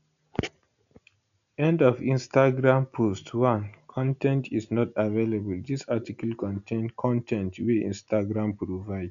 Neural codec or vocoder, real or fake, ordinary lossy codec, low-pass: none; real; none; 7.2 kHz